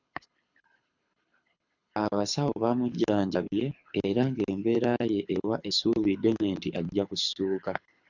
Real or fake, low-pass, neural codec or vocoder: fake; 7.2 kHz; codec, 24 kHz, 6 kbps, HILCodec